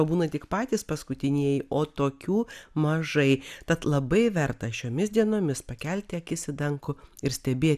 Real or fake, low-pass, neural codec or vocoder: real; 14.4 kHz; none